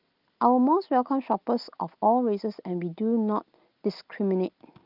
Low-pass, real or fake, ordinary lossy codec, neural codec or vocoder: 5.4 kHz; real; Opus, 24 kbps; none